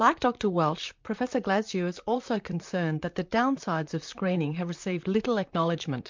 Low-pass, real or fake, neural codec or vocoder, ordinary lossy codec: 7.2 kHz; fake; vocoder, 44.1 kHz, 128 mel bands, Pupu-Vocoder; MP3, 64 kbps